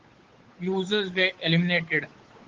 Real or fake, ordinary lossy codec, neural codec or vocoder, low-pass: fake; Opus, 16 kbps; codec, 16 kHz, 8 kbps, FunCodec, trained on Chinese and English, 25 frames a second; 7.2 kHz